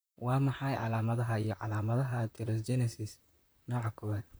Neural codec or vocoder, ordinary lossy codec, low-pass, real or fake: vocoder, 44.1 kHz, 128 mel bands, Pupu-Vocoder; none; none; fake